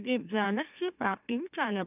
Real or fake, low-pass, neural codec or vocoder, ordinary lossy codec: fake; 3.6 kHz; autoencoder, 44.1 kHz, a latent of 192 numbers a frame, MeloTTS; none